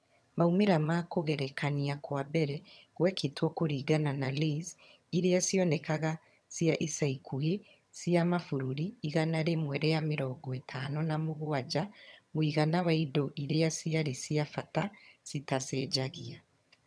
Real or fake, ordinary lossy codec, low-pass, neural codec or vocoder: fake; none; none; vocoder, 22.05 kHz, 80 mel bands, HiFi-GAN